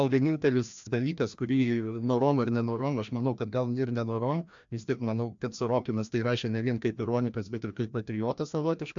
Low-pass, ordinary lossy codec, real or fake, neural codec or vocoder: 7.2 kHz; MP3, 64 kbps; fake; codec, 16 kHz, 1 kbps, FreqCodec, larger model